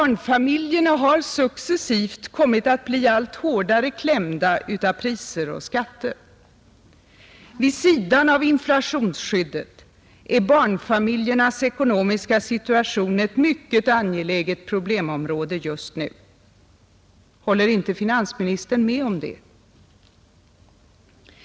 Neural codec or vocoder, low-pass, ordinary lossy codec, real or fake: none; none; none; real